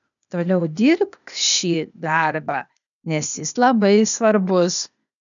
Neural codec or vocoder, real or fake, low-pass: codec, 16 kHz, 0.8 kbps, ZipCodec; fake; 7.2 kHz